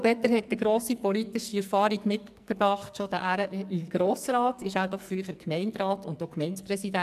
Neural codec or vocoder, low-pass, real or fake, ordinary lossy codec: codec, 44.1 kHz, 2.6 kbps, SNAC; 14.4 kHz; fake; MP3, 96 kbps